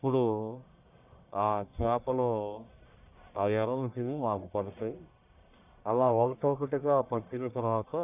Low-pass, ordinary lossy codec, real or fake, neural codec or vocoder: 3.6 kHz; none; fake; codec, 44.1 kHz, 1.7 kbps, Pupu-Codec